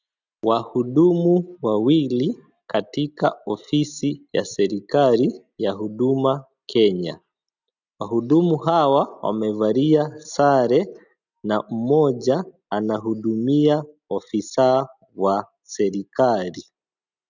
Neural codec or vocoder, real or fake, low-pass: none; real; 7.2 kHz